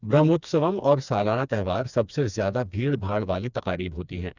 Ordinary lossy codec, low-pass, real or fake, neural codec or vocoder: none; 7.2 kHz; fake; codec, 16 kHz, 2 kbps, FreqCodec, smaller model